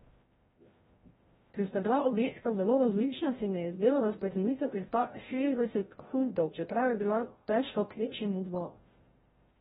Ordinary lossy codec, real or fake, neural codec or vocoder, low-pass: AAC, 16 kbps; fake; codec, 16 kHz, 0.5 kbps, FreqCodec, larger model; 7.2 kHz